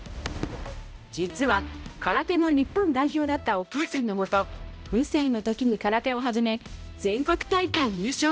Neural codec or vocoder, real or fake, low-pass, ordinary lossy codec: codec, 16 kHz, 0.5 kbps, X-Codec, HuBERT features, trained on balanced general audio; fake; none; none